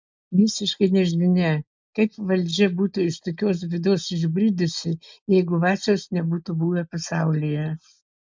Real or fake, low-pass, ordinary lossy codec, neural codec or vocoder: real; 7.2 kHz; MP3, 64 kbps; none